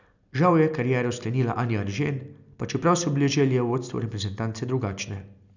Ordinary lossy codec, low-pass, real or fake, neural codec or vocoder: none; 7.2 kHz; real; none